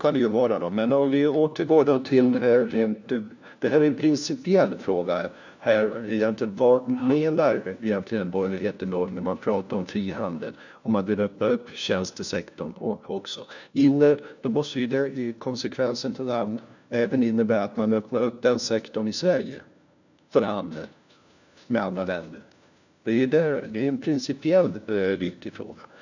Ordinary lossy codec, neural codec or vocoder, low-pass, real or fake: none; codec, 16 kHz, 1 kbps, FunCodec, trained on LibriTTS, 50 frames a second; 7.2 kHz; fake